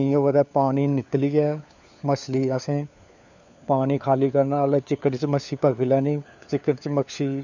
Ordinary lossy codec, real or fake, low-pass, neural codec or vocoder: none; fake; 7.2 kHz; codec, 16 kHz, 4 kbps, FunCodec, trained on LibriTTS, 50 frames a second